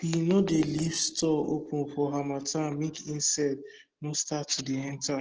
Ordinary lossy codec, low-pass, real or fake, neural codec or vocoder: Opus, 16 kbps; 7.2 kHz; real; none